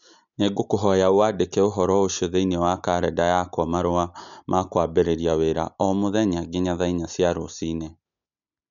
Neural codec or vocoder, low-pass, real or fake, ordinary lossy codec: none; 7.2 kHz; real; none